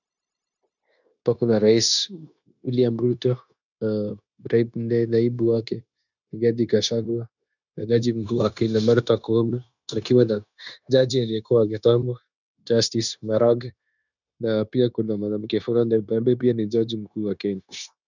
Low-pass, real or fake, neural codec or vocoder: 7.2 kHz; fake; codec, 16 kHz, 0.9 kbps, LongCat-Audio-Codec